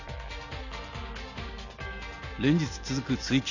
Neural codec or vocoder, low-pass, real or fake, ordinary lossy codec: none; 7.2 kHz; real; none